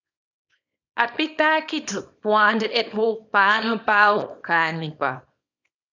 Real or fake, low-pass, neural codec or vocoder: fake; 7.2 kHz; codec, 24 kHz, 0.9 kbps, WavTokenizer, small release